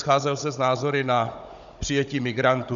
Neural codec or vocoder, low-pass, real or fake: codec, 16 kHz, 16 kbps, FunCodec, trained on Chinese and English, 50 frames a second; 7.2 kHz; fake